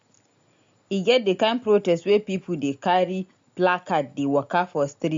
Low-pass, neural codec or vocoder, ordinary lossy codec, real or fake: 7.2 kHz; none; MP3, 48 kbps; real